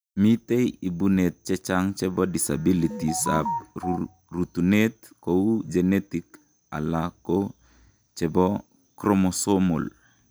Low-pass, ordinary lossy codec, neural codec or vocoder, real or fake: none; none; none; real